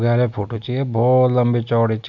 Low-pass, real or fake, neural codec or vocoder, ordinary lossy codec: 7.2 kHz; real; none; none